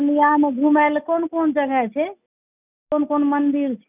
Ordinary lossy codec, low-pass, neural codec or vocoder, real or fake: none; 3.6 kHz; none; real